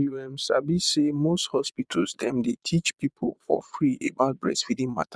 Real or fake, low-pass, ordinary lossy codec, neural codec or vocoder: fake; none; none; vocoder, 22.05 kHz, 80 mel bands, Vocos